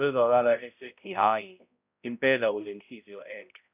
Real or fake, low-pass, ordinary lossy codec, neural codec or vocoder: fake; 3.6 kHz; none; codec, 16 kHz, 0.5 kbps, X-Codec, HuBERT features, trained on balanced general audio